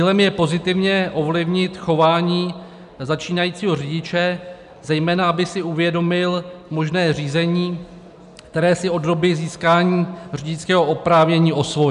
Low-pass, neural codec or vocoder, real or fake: 10.8 kHz; none; real